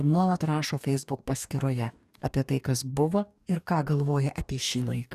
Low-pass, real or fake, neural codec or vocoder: 14.4 kHz; fake; codec, 44.1 kHz, 2.6 kbps, DAC